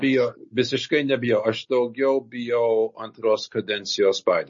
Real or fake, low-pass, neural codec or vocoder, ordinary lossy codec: real; 7.2 kHz; none; MP3, 32 kbps